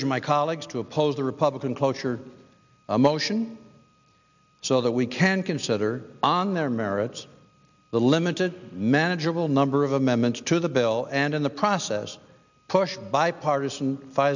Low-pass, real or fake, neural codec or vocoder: 7.2 kHz; real; none